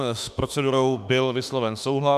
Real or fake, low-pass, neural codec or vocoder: fake; 14.4 kHz; autoencoder, 48 kHz, 32 numbers a frame, DAC-VAE, trained on Japanese speech